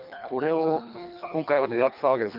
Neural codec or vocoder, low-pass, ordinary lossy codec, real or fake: codec, 24 kHz, 3 kbps, HILCodec; 5.4 kHz; none; fake